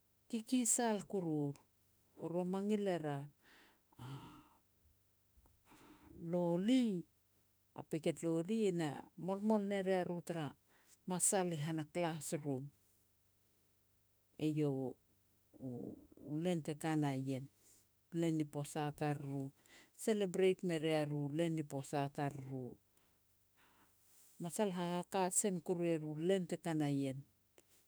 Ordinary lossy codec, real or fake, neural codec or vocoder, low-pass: none; fake; autoencoder, 48 kHz, 32 numbers a frame, DAC-VAE, trained on Japanese speech; none